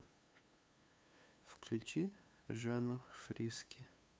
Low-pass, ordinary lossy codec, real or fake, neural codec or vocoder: none; none; fake; codec, 16 kHz, 2 kbps, FunCodec, trained on LibriTTS, 25 frames a second